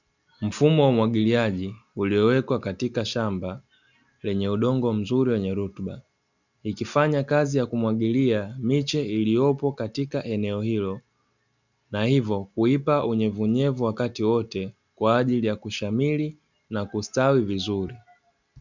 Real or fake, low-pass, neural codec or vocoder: real; 7.2 kHz; none